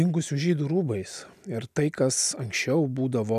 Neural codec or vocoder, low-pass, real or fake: none; 14.4 kHz; real